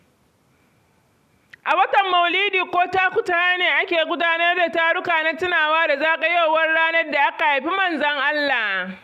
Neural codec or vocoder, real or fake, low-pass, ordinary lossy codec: none; real; 14.4 kHz; none